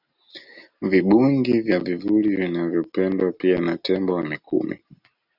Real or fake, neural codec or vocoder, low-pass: real; none; 5.4 kHz